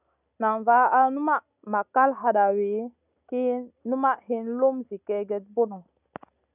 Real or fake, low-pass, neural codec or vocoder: real; 3.6 kHz; none